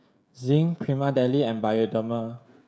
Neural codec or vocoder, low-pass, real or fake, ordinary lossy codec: codec, 16 kHz, 16 kbps, FreqCodec, smaller model; none; fake; none